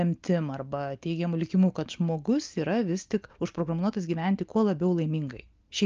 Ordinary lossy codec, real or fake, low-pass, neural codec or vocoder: Opus, 24 kbps; real; 7.2 kHz; none